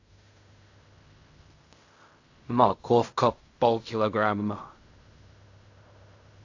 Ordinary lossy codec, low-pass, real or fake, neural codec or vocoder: AAC, 48 kbps; 7.2 kHz; fake; codec, 16 kHz in and 24 kHz out, 0.4 kbps, LongCat-Audio-Codec, fine tuned four codebook decoder